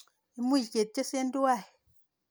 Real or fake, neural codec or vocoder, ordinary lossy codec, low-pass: real; none; none; none